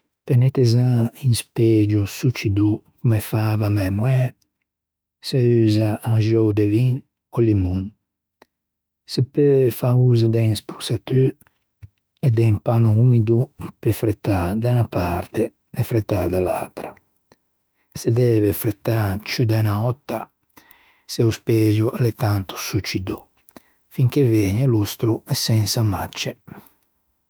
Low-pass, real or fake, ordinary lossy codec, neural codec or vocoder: none; fake; none; autoencoder, 48 kHz, 32 numbers a frame, DAC-VAE, trained on Japanese speech